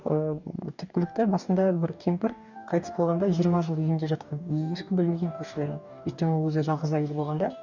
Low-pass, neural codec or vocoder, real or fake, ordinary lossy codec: 7.2 kHz; codec, 44.1 kHz, 2.6 kbps, DAC; fake; none